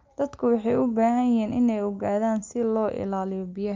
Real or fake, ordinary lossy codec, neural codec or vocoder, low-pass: real; Opus, 24 kbps; none; 7.2 kHz